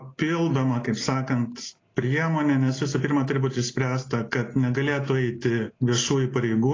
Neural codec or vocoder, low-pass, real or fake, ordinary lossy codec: none; 7.2 kHz; real; AAC, 32 kbps